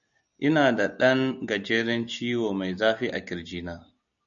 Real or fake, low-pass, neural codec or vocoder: real; 7.2 kHz; none